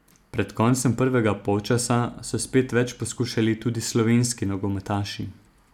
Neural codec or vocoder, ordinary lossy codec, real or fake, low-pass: none; none; real; 19.8 kHz